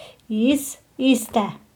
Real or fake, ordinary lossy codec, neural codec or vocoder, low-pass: real; none; none; 19.8 kHz